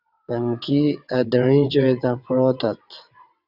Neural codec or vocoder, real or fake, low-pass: vocoder, 22.05 kHz, 80 mel bands, WaveNeXt; fake; 5.4 kHz